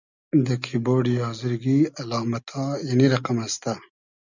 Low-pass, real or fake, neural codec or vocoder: 7.2 kHz; real; none